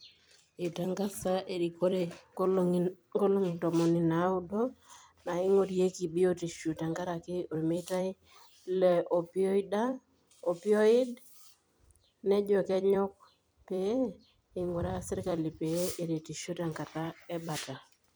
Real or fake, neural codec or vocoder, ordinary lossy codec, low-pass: fake; vocoder, 44.1 kHz, 128 mel bands every 256 samples, BigVGAN v2; none; none